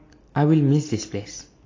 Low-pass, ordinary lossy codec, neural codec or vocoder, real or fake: 7.2 kHz; AAC, 32 kbps; none; real